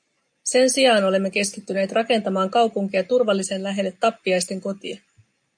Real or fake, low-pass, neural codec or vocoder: real; 9.9 kHz; none